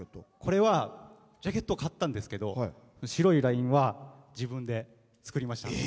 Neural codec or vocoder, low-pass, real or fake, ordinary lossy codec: none; none; real; none